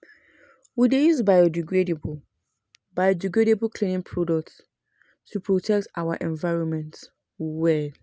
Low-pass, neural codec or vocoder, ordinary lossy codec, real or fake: none; none; none; real